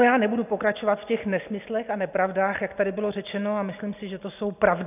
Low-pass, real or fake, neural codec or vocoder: 3.6 kHz; real; none